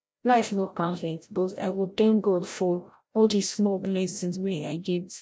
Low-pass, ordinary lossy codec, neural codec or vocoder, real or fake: none; none; codec, 16 kHz, 0.5 kbps, FreqCodec, larger model; fake